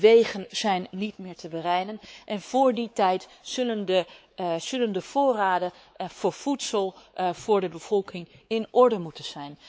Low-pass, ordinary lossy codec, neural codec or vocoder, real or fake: none; none; codec, 16 kHz, 4 kbps, X-Codec, WavLM features, trained on Multilingual LibriSpeech; fake